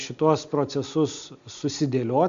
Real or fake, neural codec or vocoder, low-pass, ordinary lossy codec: real; none; 7.2 kHz; MP3, 64 kbps